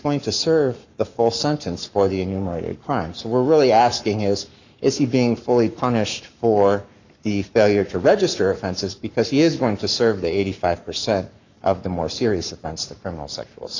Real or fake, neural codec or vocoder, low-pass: fake; codec, 44.1 kHz, 7.8 kbps, Pupu-Codec; 7.2 kHz